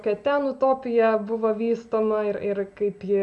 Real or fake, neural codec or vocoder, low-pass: real; none; 10.8 kHz